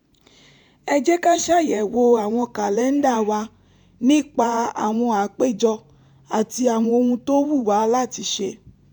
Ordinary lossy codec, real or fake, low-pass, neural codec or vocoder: none; fake; 19.8 kHz; vocoder, 44.1 kHz, 128 mel bands every 512 samples, BigVGAN v2